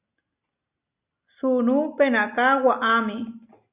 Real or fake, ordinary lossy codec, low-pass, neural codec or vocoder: real; Opus, 64 kbps; 3.6 kHz; none